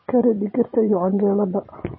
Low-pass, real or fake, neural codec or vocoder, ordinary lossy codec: 7.2 kHz; fake; vocoder, 24 kHz, 100 mel bands, Vocos; MP3, 24 kbps